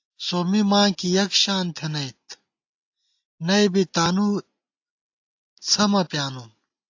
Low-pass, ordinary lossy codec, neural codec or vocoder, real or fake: 7.2 kHz; AAC, 48 kbps; none; real